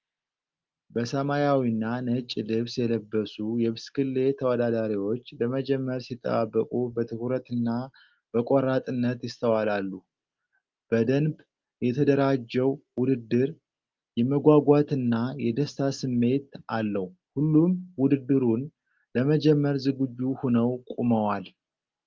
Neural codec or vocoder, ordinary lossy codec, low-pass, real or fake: none; Opus, 32 kbps; 7.2 kHz; real